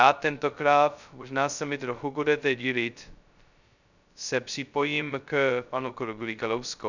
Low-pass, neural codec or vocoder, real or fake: 7.2 kHz; codec, 16 kHz, 0.2 kbps, FocalCodec; fake